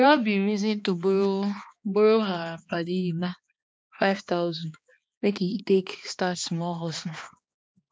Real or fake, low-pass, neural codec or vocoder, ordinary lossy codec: fake; none; codec, 16 kHz, 2 kbps, X-Codec, HuBERT features, trained on balanced general audio; none